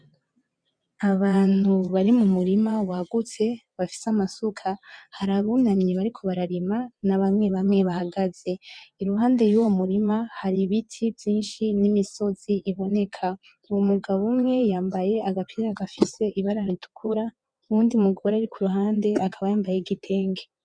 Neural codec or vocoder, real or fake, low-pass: vocoder, 22.05 kHz, 80 mel bands, WaveNeXt; fake; 9.9 kHz